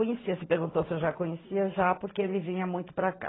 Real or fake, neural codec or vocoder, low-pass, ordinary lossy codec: real; none; 7.2 kHz; AAC, 16 kbps